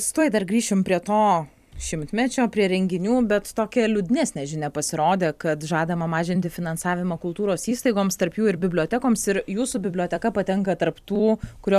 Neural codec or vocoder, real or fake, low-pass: vocoder, 44.1 kHz, 128 mel bands every 256 samples, BigVGAN v2; fake; 14.4 kHz